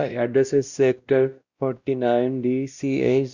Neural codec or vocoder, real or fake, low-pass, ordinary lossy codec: codec, 16 kHz, 1 kbps, X-Codec, WavLM features, trained on Multilingual LibriSpeech; fake; 7.2 kHz; Opus, 64 kbps